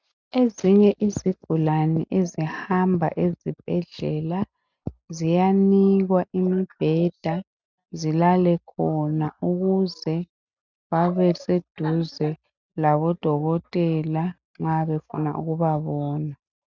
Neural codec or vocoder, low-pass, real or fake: none; 7.2 kHz; real